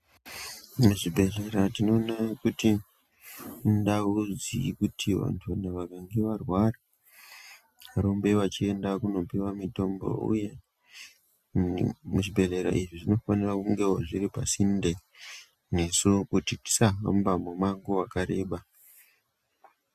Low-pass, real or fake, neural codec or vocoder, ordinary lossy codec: 14.4 kHz; real; none; Opus, 64 kbps